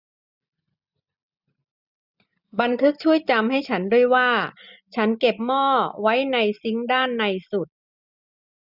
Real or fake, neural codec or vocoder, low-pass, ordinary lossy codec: real; none; 5.4 kHz; none